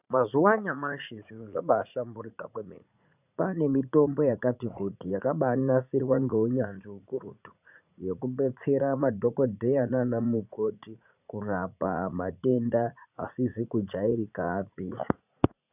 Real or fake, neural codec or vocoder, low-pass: fake; vocoder, 44.1 kHz, 80 mel bands, Vocos; 3.6 kHz